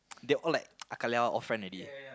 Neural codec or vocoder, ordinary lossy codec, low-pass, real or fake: none; none; none; real